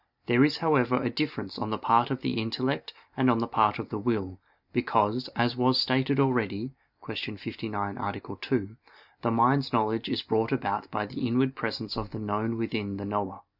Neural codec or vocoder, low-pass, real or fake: none; 5.4 kHz; real